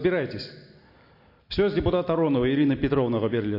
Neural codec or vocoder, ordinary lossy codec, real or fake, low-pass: none; MP3, 32 kbps; real; 5.4 kHz